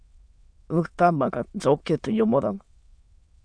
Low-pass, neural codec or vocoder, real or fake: 9.9 kHz; autoencoder, 22.05 kHz, a latent of 192 numbers a frame, VITS, trained on many speakers; fake